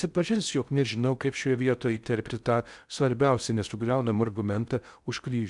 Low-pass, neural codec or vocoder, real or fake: 10.8 kHz; codec, 16 kHz in and 24 kHz out, 0.6 kbps, FocalCodec, streaming, 4096 codes; fake